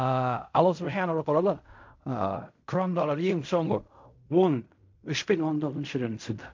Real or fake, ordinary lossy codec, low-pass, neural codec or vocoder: fake; MP3, 48 kbps; 7.2 kHz; codec, 16 kHz in and 24 kHz out, 0.4 kbps, LongCat-Audio-Codec, fine tuned four codebook decoder